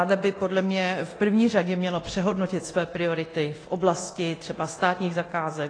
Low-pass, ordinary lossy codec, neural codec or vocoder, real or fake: 9.9 kHz; AAC, 32 kbps; codec, 24 kHz, 0.9 kbps, DualCodec; fake